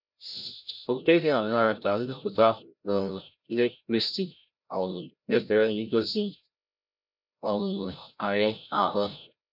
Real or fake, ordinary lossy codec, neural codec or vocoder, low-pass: fake; none; codec, 16 kHz, 0.5 kbps, FreqCodec, larger model; 5.4 kHz